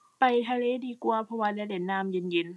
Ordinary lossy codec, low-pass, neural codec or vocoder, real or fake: none; none; none; real